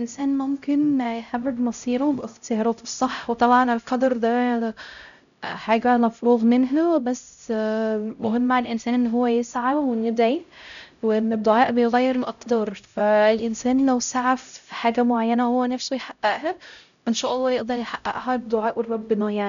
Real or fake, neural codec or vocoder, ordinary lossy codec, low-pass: fake; codec, 16 kHz, 0.5 kbps, X-Codec, HuBERT features, trained on LibriSpeech; none; 7.2 kHz